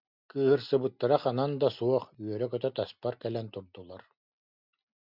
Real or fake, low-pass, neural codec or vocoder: real; 5.4 kHz; none